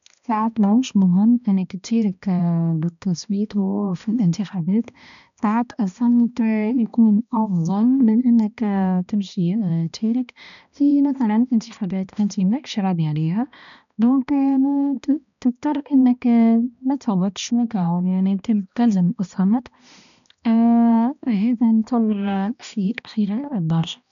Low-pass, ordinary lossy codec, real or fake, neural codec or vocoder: 7.2 kHz; none; fake; codec, 16 kHz, 1 kbps, X-Codec, HuBERT features, trained on balanced general audio